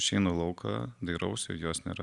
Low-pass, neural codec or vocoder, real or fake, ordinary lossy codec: 10.8 kHz; none; real; Opus, 64 kbps